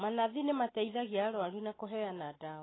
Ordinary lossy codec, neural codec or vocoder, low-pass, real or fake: AAC, 16 kbps; none; 7.2 kHz; real